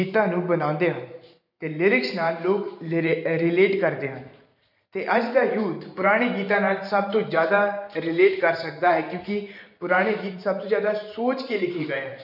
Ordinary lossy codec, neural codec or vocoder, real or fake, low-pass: none; vocoder, 44.1 kHz, 128 mel bands every 512 samples, BigVGAN v2; fake; 5.4 kHz